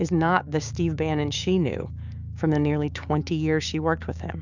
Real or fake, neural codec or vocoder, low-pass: fake; codec, 16 kHz, 6 kbps, DAC; 7.2 kHz